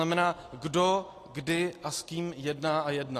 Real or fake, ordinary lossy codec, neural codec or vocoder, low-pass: real; AAC, 48 kbps; none; 14.4 kHz